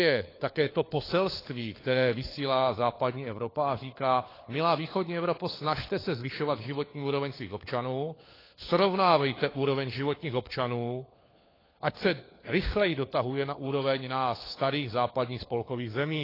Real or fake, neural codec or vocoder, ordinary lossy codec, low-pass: fake; codec, 16 kHz, 4 kbps, FunCodec, trained on LibriTTS, 50 frames a second; AAC, 24 kbps; 5.4 kHz